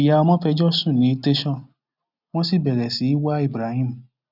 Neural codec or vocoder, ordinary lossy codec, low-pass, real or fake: none; none; 5.4 kHz; real